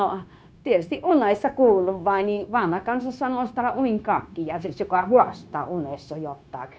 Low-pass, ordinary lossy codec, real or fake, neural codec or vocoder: none; none; fake; codec, 16 kHz, 0.9 kbps, LongCat-Audio-Codec